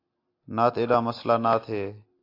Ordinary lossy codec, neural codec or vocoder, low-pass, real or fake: AAC, 32 kbps; none; 5.4 kHz; real